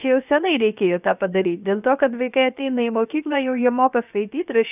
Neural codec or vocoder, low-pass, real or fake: codec, 16 kHz, about 1 kbps, DyCAST, with the encoder's durations; 3.6 kHz; fake